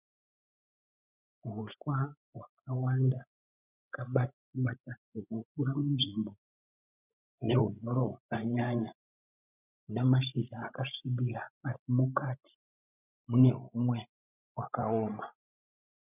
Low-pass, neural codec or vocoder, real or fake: 3.6 kHz; codec, 16 kHz, 16 kbps, FreqCodec, larger model; fake